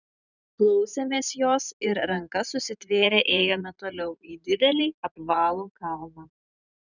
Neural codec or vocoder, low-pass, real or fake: vocoder, 44.1 kHz, 128 mel bands every 512 samples, BigVGAN v2; 7.2 kHz; fake